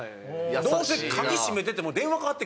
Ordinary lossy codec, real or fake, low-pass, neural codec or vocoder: none; real; none; none